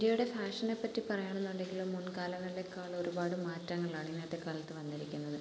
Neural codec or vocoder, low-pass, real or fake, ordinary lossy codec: none; none; real; none